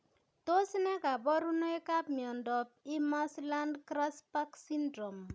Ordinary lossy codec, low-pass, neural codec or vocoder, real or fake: none; none; none; real